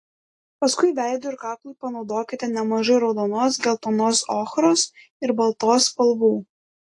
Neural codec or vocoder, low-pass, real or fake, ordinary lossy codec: none; 10.8 kHz; real; AAC, 48 kbps